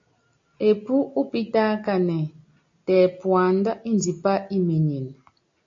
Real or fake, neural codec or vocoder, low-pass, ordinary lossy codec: real; none; 7.2 kHz; AAC, 48 kbps